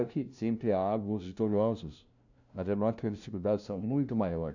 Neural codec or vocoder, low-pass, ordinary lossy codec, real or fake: codec, 16 kHz, 0.5 kbps, FunCodec, trained on LibriTTS, 25 frames a second; 7.2 kHz; none; fake